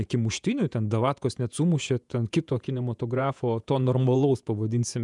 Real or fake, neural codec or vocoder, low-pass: fake; vocoder, 48 kHz, 128 mel bands, Vocos; 10.8 kHz